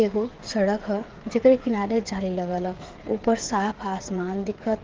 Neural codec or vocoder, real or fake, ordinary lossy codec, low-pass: codec, 16 kHz, 8 kbps, FreqCodec, smaller model; fake; Opus, 16 kbps; 7.2 kHz